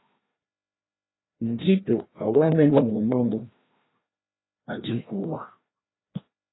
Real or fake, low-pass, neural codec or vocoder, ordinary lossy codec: fake; 7.2 kHz; codec, 16 kHz, 1 kbps, FreqCodec, larger model; AAC, 16 kbps